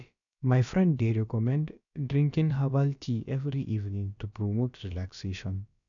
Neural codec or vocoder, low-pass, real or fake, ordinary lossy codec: codec, 16 kHz, about 1 kbps, DyCAST, with the encoder's durations; 7.2 kHz; fake; none